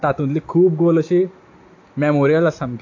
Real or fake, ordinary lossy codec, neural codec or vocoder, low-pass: real; AAC, 48 kbps; none; 7.2 kHz